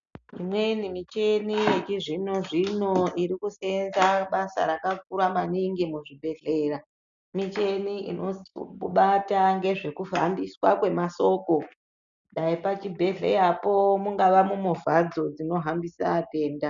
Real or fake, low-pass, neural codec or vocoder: real; 7.2 kHz; none